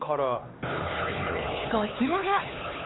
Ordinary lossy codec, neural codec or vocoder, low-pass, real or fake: AAC, 16 kbps; codec, 16 kHz, 4 kbps, X-Codec, HuBERT features, trained on LibriSpeech; 7.2 kHz; fake